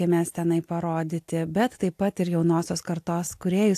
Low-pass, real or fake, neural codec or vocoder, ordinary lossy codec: 14.4 kHz; real; none; AAC, 64 kbps